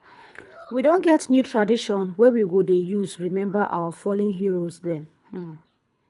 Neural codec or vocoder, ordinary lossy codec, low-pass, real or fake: codec, 24 kHz, 3 kbps, HILCodec; none; 10.8 kHz; fake